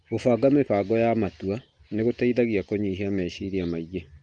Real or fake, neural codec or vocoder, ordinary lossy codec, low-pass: real; none; Opus, 24 kbps; 9.9 kHz